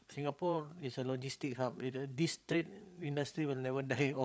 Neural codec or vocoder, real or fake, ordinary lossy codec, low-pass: codec, 16 kHz, 8 kbps, FreqCodec, larger model; fake; none; none